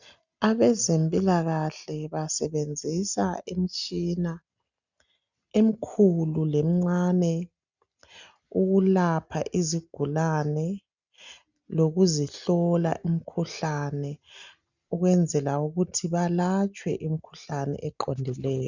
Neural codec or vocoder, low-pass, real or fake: none; 7.2 kHz; real